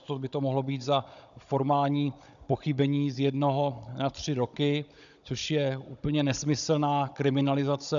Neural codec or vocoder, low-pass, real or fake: codec, 16 kHz, 16 kbps, FunCodec, trained on Chinese and English, 50 frames a second; 7.2 kHz; fake